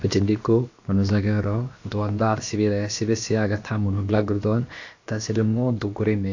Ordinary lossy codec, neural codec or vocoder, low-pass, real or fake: AAC, 48 kbps; codec, 16 kHz, about 1 kbps, DyCAST, with the encoder's durations; 7.2 kHz; fake